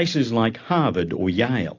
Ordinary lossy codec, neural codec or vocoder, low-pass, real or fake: AAC, 48 kbps; none; 7.2 kHz; real